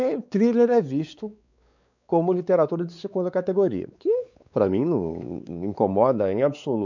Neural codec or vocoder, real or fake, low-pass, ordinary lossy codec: codec, 16 kHz, 4 kbps, X-Codec, WavLM features, trained on Multilingual LibriSpeech; fake; 7.2 kHz; none